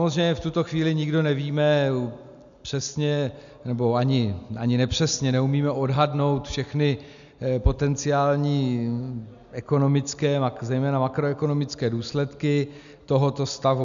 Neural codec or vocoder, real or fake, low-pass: none; real; 7.2 kHz